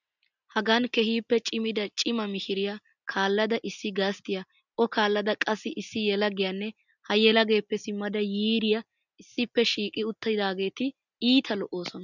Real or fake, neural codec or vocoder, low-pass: real; none; 7.2 kHz